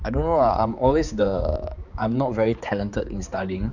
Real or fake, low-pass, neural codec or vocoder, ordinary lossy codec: fake; 7.2 kHz; codec, 16 kHz, 4 kbps, X-Codec, HuBERT features, trained on balanced general audio; none